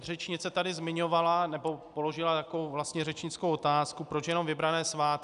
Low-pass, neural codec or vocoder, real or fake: 10.8 kHz; none; real